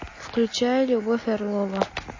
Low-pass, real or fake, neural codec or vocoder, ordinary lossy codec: 7.2 kHz; real; none; MP3, 32 kbps